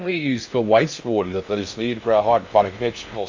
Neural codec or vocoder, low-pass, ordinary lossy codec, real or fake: codec, 16 kHz in and 24 kHz out, 0.6 kbps, FocalCodec, streaming, 2048 codes; 7.2 kHz; MP3, 48 kbps; fake